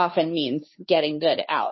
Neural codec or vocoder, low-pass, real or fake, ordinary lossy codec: codec, 16 kHz, 2 kbps, FunCodec, trained on Chinese and English, 25 frames a second; 7.2 kHz; fake; MP3, 24 kbps